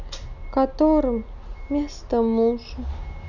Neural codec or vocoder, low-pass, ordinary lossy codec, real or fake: none; 7.2 kHz; none; real